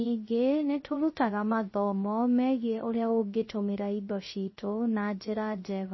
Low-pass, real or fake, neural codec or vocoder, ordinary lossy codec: 7.2 kHz; fake; codec, 16 kHz, 0.3 kbps, FocalCodec; MP3, 24 kbps